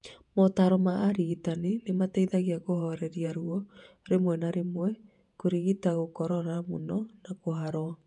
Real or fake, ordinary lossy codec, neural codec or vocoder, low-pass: fake; none; vocoder, 48 kHz, 128 mel bands, Vocos; 10.8 kHz